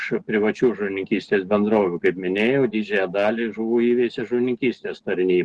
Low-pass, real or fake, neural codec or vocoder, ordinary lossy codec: 10.8 kHz; real; none; Opus, 16 kbps